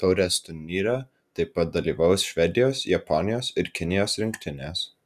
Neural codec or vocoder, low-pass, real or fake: none; 14.4 kHz; real